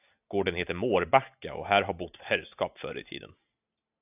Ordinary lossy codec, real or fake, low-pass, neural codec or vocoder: AAC, 32 kbps; real; 3.6 kHz; none